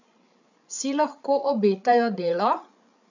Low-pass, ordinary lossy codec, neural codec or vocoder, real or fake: 7.2 kHz; none; codec, 16 kHz, 8 kbps, FreqCodec, larger model; fake